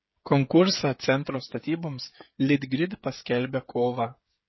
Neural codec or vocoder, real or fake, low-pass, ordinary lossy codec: codec, 16 kHz, 16 kbps, FreqCodec, smaller model; fake; 7.2 kHz; MP3, 24 kbps